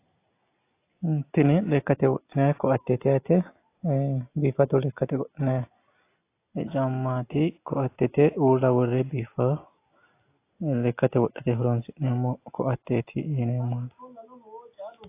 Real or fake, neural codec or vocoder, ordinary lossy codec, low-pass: real; none; AAC, 24 kbps; 3.6 kHz